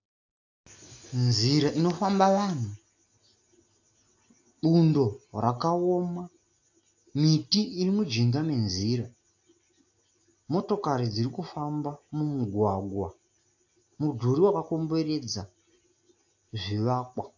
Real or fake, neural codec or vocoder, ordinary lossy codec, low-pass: real; none; AAC, 48 kbps; 7.2 kHz